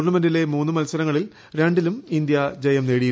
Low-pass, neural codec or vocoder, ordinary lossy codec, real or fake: none; none; none; real